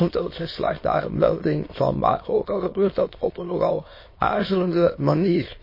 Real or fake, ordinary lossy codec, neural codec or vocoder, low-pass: fake; MP3, 24 kbps; autoencoder, 22.05 kHz, a latent of 192 numbers a frame, VITS, trained on many speakers; 5.4 kHz